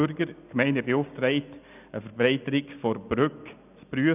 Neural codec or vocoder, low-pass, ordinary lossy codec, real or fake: none; 3.6 kHz; none; real